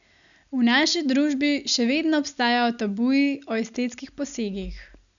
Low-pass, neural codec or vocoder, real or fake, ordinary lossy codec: 7.2 kHz; none; real; none